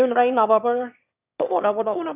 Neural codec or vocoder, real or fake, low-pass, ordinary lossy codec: autoencoder, 22.05 kHz, a latent of 192 numbers a frame, VITS, trained on one speaker; fake; 3.6 kHz; none